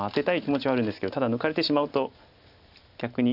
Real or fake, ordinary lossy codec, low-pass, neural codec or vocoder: real; none; 5.4 kHz; none